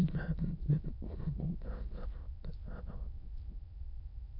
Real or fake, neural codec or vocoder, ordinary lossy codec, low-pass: fake; autoencoder, 22.05 kHz, a latent of 192 numbers a frame, VITS, trained on many speakers; none; 5.4 kHz